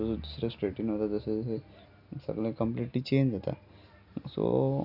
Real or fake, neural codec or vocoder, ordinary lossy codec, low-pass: real; none; none; 5.4 kHz